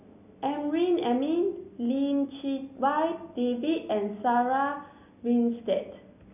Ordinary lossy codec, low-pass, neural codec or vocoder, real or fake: none; 3.6 kHz; none; real